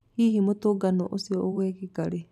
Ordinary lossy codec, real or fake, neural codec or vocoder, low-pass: none; fake; vocoder, 44.1 kHz, 128 mel bands every 512 samples, BigVGAN v2; 14.4 kHz